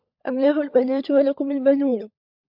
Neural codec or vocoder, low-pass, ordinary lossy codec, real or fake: codec, 16 kHz, 16 kbps, FunCodec, trained on LibriTTS, 50 frames a second; 5.4 kHz; MP3, 48 kbps; fake